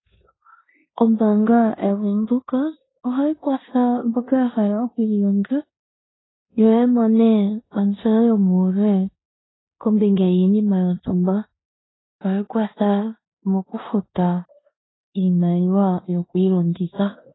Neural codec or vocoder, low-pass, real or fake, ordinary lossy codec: codec, 16 kHz in and 24 kHz out, 0.9 kbps, LongCat-Audio-Codec, four codebook decoder; 7.2 kHz; fake; AAC, 16 kbps